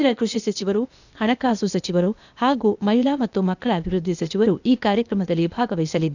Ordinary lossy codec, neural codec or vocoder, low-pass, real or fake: none; codec, 16 kHz, 0.8 kbps, ZipCodec; 7.2 kHz; fake